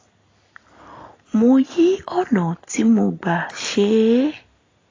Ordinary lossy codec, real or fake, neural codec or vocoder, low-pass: AAC, 32 kbps; real; none; 7.2 kHz